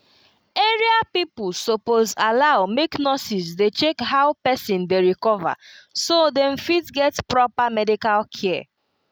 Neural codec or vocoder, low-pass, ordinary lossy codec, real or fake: none; none; none; real